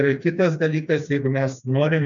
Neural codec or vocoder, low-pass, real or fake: codec, 16 kHz, 2 kbps, FreqCodec, smaller model; 7.2 kHz; fake